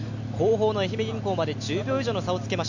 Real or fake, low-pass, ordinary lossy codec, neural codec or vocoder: real; 7.2 kHz; none; none